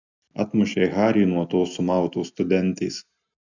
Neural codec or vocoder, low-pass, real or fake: none; 7.2 kHz; real